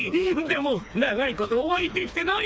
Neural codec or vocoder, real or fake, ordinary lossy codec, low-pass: codec, 16 kHz, 2 kbps, FreqCodec, smaller model; fake; none; none